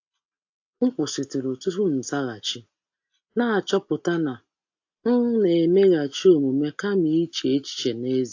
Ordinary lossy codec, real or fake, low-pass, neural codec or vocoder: AAC, 48 kbps; real; 7.2 kHz; none